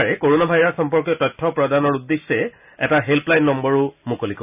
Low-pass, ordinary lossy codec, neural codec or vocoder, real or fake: 3.6 kHz; none; none; real